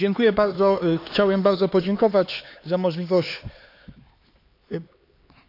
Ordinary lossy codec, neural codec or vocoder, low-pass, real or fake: AAC, 32 kbps; codec, 16 kHz, 4 kbps, X-Codec, HuBERT features, trained on LibriSpeech; 5.4 kHz; fake